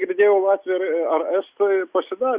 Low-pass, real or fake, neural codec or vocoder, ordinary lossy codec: 3.6 kHz; real; none; Opus, 64 kbps